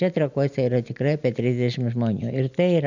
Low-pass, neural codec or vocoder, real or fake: 7.2 kHz; none; real